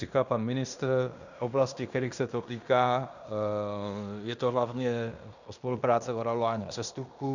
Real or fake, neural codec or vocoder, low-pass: fake; codec, 16 kHz in and 24 kHz out, 0.9 kbps, LongCat-Audio-Codec, fine tuned four codebook decoder; 7.2 kHz